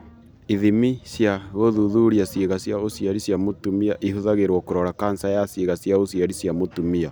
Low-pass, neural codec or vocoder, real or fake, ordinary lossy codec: none; none; real; none